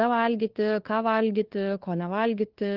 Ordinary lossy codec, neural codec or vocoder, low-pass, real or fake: Opus, 16 kbps; codec, 16 kHz, 4 kbps, FunCodec, trained on LibriTTS, 50 frames a second; 5.4 kHz; fake